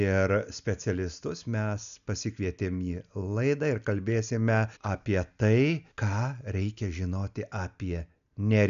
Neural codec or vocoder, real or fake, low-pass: none; real; 7.2 kHz